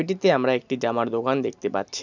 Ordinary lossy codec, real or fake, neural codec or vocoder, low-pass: none; real; none; 7.2 kHz